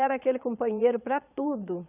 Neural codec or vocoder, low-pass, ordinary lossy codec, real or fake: vocoder, 22.05 kHz, 80 mel bands, Vocos; 3.6 kHz; none; fake